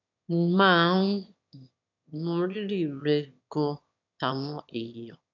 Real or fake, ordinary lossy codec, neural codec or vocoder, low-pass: fake; none; autoencoder, 22.05 kHz, a latent of 192 numbers a frame, VITS, trained on one speaker; 7.2 kHz